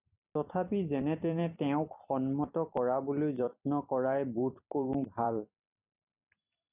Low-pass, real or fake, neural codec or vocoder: 3.6 kHz; fake; vocoder, 44.1 kHz, 128 mel bands every 256 samples, BigVGAN v2